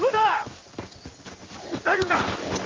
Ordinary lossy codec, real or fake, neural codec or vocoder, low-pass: Opus, 24 kbps; fake; codec, 16 kHz in and 24 kHz out, 1 kbps, XY-Tokenizer; 7.2 kHz